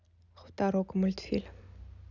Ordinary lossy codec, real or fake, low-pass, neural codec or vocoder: none; real; 7.2 kHz; none